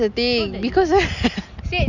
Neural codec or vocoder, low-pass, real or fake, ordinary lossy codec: none; 7.2 kHz; real; none